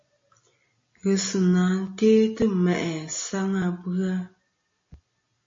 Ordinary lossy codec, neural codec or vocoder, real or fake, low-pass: MP3, 32 kbps; none; real; 7.2 kHz